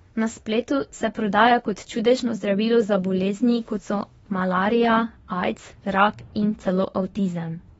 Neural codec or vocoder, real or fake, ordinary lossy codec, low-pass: autoencoder, 48 kHz, 32 numbers a frame, DAC-VAE, trained on Japanese speech; fake; AAC, 24 kbps; 19.8 kHz